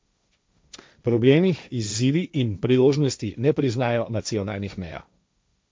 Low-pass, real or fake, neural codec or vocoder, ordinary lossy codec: none; fake; codec, 16 kHz, 1.1 kbps, Voila-Tokenizer; none